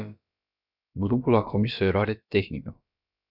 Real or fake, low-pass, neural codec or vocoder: fake; 5.4 kHz; codec, 16 kHz, about 1 kbps, DyCAST, with the encoder's durations